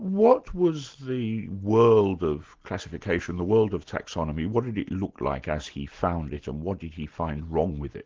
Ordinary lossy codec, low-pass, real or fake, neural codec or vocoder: Opus, 16 kbps; 7.2 kHz; real; none